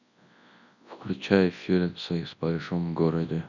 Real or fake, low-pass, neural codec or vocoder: fake; 7.2 kHz; codec, 24 kHz, 0.9 kbps, WavTokenizer, large speech release